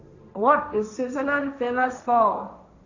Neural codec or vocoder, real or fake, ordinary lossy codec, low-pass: codec, 16 kHz, 1.1 kbps, Voila-Tokenizer; fake; none; 7.2 kHz